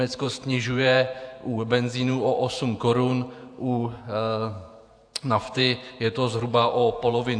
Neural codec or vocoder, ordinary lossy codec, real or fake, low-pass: vocoder, 48 kHz, 128 mel bands, Vocos; AAC, 64 kbps; fake; 9.9 kHz